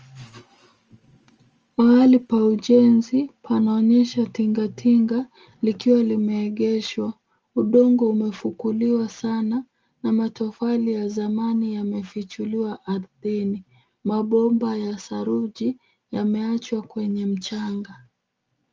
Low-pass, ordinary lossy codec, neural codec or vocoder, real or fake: 7.2 kHz; Opus, 24 kbps; none; real